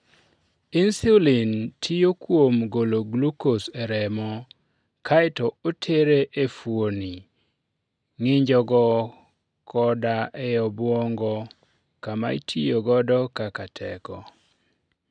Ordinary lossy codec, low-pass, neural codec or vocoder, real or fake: none; 9.9 kHz; none; real